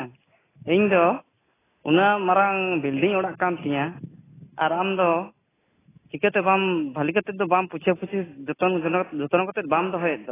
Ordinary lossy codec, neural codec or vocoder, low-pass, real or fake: AAC, 16 kbps; none; 3.6 kHz; real